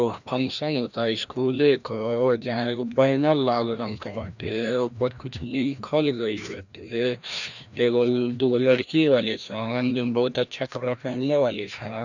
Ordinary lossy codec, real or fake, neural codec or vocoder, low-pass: none; fake; codec, 16 kHz, 1 kbps, FreqCodec, larger model; 7.2 kHz